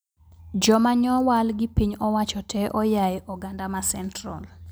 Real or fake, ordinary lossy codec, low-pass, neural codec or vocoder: real; none; none; none